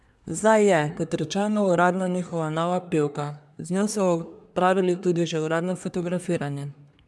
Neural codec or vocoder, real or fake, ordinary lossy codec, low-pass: codec, 24 kHz, 1 kbps, SNAC; fake; none; none